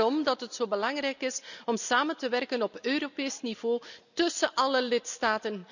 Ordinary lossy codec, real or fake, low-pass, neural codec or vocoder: none; real; 7.2 kHz; none